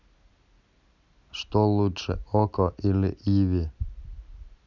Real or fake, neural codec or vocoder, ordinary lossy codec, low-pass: real; none; none; 7.2 kHz